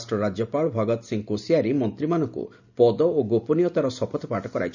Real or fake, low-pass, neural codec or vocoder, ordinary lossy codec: real; 7.2 kHz; none; none